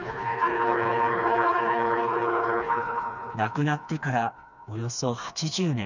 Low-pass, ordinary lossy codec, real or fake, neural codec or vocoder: 7.2 kHz; none; fake; codec, 16 kHz, 2 kbps, FreqCodec, smaller model